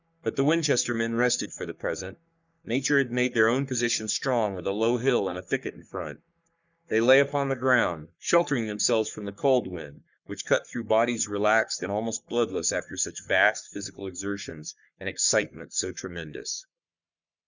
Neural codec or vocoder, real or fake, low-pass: codec, 44.1 kHz, 3.4 kbps, Pupu-Codec; fake; 7.2 kHz